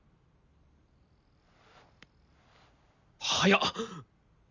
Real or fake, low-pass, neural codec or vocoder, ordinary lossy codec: real; 7.2 kHz; none; none